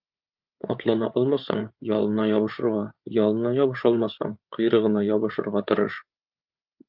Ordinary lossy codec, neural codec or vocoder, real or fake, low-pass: Opus, 24 kbps; codec, 16 kHz, 8 kbps, FreqCodec, larger model; fake; 5.4 kHz